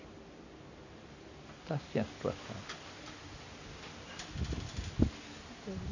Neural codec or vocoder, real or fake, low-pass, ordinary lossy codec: none; real; 7.2 kHz; none